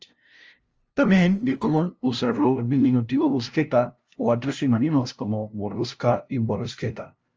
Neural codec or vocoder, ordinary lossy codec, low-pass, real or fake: codec, 16 kHz, 0.5 kbps, FunCodec, trained on LibriTTS, 25 frames a second; Opus, 24 kbps; 7.2 kHz; fake